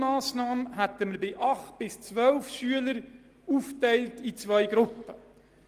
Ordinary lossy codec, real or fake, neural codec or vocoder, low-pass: Opus, 24 kbps; real; none; 14.4 kHz